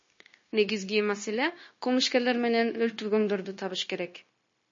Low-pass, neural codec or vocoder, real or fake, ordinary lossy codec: 7.2 kHz; codec, 16 kHz, 0.9 kbps, LongCat-Audio-Codec; fake; MP3, 32 kbps